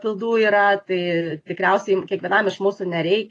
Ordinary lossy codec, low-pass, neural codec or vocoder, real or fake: AAC, 32 kbps; 10.8 kHz; none; real